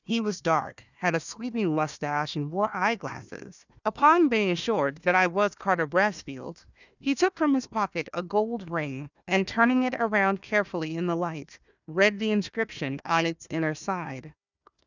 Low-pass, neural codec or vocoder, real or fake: 7.2 kHz; codec, 16 kHz, 1 kbps, FunCodec, trained on Chinese and English, 50 frames a second; fake